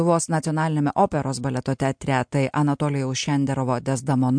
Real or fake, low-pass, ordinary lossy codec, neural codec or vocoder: real; 9.9 kHz; MP3, 64 kbps; none